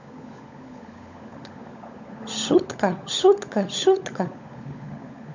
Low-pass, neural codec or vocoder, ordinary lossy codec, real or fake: 7.2 kHz; codec, 16 kHz, 16 kbps, FunCodec, trained on LibriTTS, 50 frames a second; none; fake